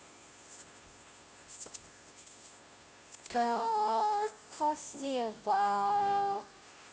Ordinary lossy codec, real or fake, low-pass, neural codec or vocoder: none; fake; none; codec, 16 kHz, 0.5 kbps, FunCodec, trained on Chinese and English, 25 frames a second